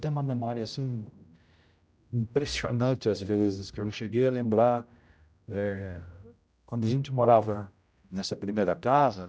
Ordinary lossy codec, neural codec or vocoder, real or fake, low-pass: none; codec, 16 kHz, 0.5 kbps, X-Codec, HuBERT features, trained on general audio; fake; none